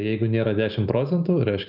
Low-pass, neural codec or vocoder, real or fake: 5.4 kHz; none; real